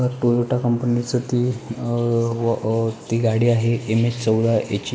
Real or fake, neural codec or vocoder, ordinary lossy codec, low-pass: real; none; none; none